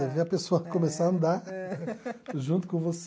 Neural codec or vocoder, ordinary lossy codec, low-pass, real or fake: none; none; none; real